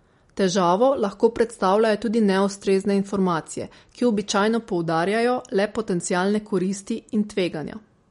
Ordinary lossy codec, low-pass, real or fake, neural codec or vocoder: MP3, 48 kbps; 10.8 kHz; real; none